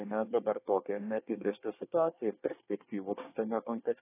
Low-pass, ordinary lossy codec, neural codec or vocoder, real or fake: 3.6 kHz; AAC, 16 kbps; codec, 24 kHz, 1 kbps, SNAC; fake